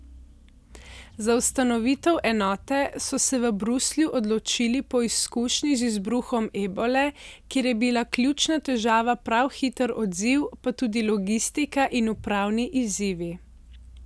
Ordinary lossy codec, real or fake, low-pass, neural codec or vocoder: none; real; none; none